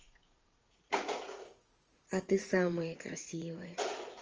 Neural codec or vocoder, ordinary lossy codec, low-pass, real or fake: vocoder, 44.1 kHz, 80 mel bands, Vocos; Opus, 24 kbps; 7.2 kHz; fake